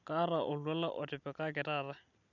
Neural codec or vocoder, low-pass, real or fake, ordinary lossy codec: none; 7.2 kHz; real; none